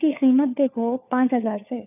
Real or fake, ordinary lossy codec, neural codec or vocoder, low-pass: fake; none; codec, 16 kHz, 4 kbps, FunCodec, trained on LibriTTS, 50 frames a second; 3.6 kHz